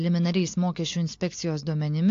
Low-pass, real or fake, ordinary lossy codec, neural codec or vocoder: 7.2 kHz; real; AAC, 48 kbps; none